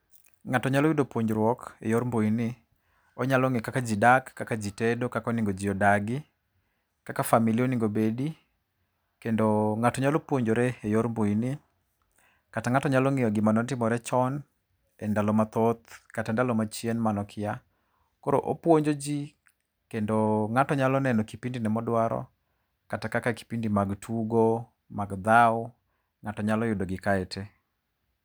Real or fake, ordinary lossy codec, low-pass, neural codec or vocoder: real; none; none; none